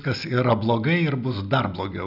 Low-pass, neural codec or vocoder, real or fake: 5.4 kHz; none; real